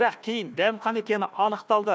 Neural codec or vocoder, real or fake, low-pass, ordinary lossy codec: codec, 16 kHz, 1 kbps, FunCodec, trained on Chinese and English, 50 frames a second; fake; none; none